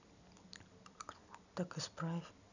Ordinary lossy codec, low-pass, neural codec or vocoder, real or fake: none; 7.2 kHz; none; real